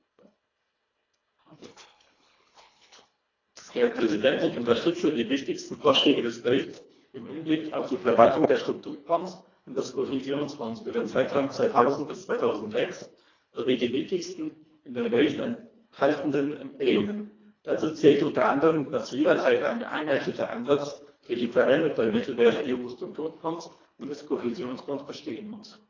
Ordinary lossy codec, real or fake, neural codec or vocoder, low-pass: AAC, 32 kbps; fake; codec, 24 kHz, 1.5 kbps, HILCodec; 7.2 kHz